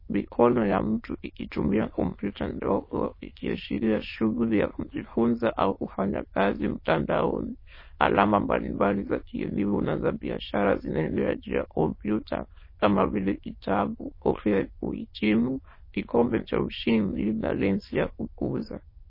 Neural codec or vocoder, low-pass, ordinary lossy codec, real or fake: autoencoder, 22.05 kHz, a latent of 192 numbers a frame, VITS, trained on many speakers; 5.4 kHz; MP3, 24 kbps; fake